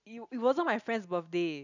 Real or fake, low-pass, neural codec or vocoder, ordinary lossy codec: real; 7.2 kHz; none; none